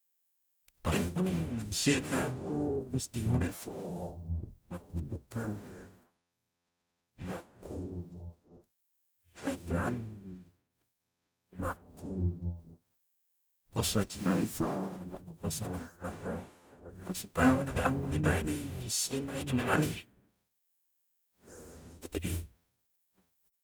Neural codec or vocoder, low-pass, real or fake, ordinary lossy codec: codec, 44.1 kHz, 0.9 kbps, DAC; none; fake; none